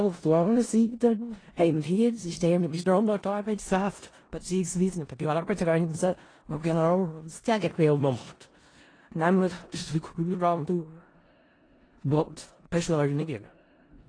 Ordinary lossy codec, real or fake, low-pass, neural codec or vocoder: AAC, 32 kbps; fake; 9.9 kHz; codec, 16 kHz in and 24 kHz out, 0.4 kbps, LongCat-Audio-Codec, four codebook decoder